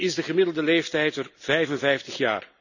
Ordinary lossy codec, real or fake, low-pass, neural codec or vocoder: none; real; 7.2 kHz; none